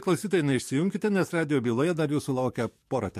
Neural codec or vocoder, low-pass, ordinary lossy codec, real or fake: codec, 44.1 kHz, 7.8 kbps, DAC; 14.4 kHz; MP3, 64 kbps; fake